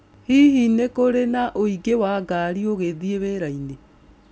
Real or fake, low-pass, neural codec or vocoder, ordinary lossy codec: real; none; none; none